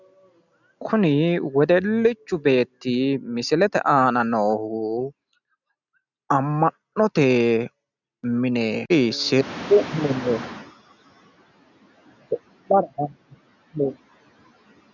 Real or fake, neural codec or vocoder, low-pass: real; none; 7.2 kHz